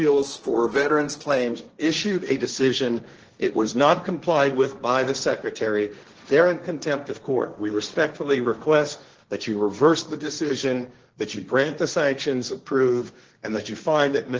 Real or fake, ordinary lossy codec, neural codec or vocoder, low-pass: fake; Opus, 16 kbps; codec, 16 kHz, 1.1 kbps, Voila-Tokenizer; 7.2 kHz